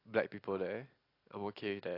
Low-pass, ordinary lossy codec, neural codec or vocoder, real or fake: 5.4 kHz; AAC, 32 kbps; none; real